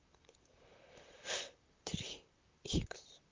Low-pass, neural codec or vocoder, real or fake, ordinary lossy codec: 7.2 kHz; vocoder, 44.1 kHz, 128 mel bands, Pupu-Vocoder; fake; Opus, 24 kbps